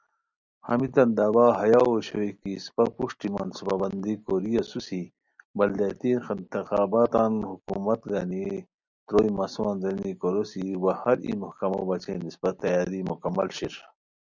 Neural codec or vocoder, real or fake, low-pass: none; real; 7.2 kHz